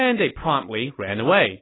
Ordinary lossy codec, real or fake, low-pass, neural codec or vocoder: AAC, 16 kbps; real; 7.2 kHz; none